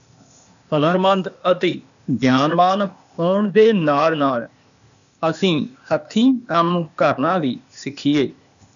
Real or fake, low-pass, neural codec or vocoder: fake; 7.2 kHz; codec, 16 kHz, 0.8 kbps, ZipCodec